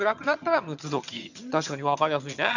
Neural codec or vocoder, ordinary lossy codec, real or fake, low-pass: vocoder, 22.05 kHz, 80 mel bands, HiFi-GAN; none; fake; 7.2 kHz